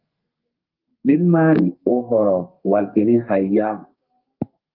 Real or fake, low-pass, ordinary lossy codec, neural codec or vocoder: fake; 5.4 kHz; Opus, 32 kbps; codec, 32 kHz, 1.9 kbps, SNAC